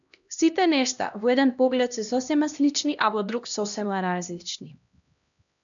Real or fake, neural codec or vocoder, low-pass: fake; codec, 16 kHz, 1 kbps, X-Codec, HuBERT features, trained on LibriSpeech; 7.2 kHz